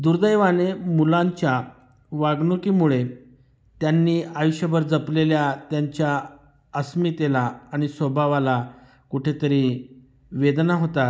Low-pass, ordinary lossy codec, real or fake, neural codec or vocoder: none; none; real; none